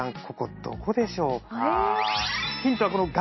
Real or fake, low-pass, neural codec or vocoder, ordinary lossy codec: real; 7.2 kHz; none; MP3, 24 kbps